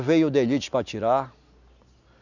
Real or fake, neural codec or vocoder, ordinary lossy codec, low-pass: real; none; none; 7.2 kHz